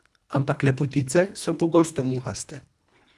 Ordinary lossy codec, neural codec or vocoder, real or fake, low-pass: none; codec, 24 kHz, 1.5 kbps, HILCodec; fake; none